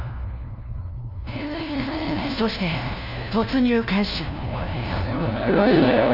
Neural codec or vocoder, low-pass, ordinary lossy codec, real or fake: codec, 16 kHz, 1 kbps, FunCodec, trained on LibriTTS, 50 frames a second; 5.4 kHz; none; fake